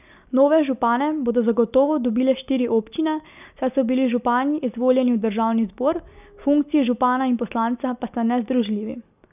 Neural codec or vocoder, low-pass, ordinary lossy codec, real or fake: none; 3.6 kHz; none; real